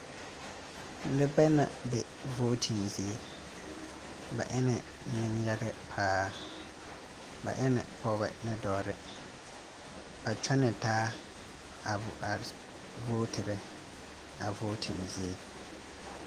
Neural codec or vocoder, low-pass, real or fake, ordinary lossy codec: autoencoder, 48 kHz, 128 numbers a frame, DAC-VAE, trained on Japanese speech; 14.4 kHz; fake; Opus, 24 kbps